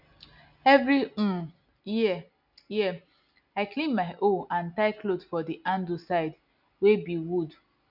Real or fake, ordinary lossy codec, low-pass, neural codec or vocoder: real; none; 5.4 kHz; none